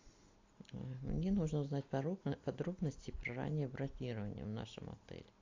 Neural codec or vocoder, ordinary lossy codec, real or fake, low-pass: none; AAC, 48 kbps; real; 7.2 kHz